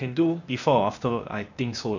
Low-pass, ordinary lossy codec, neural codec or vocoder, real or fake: 7.2 kHz; none; codec, 16 kHz, 0.8 kbps, ZipCodec; fake